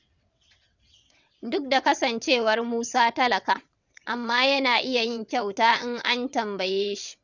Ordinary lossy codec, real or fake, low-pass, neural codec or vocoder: none; fake; 7.2 kHz; vocoder, 22.05 kHz, 80 mel bands, WaveNeXt